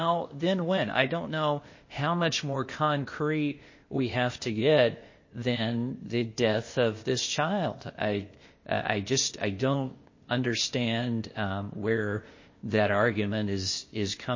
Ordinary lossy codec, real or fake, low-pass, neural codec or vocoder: MP3, 32 kbps; fake; 7.2 kHz; codec, 16 kHz, 0.8 kbps, ZipCodec